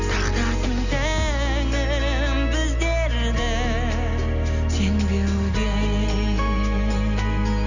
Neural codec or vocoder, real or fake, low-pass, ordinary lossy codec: none; real; 7.2 kHz; none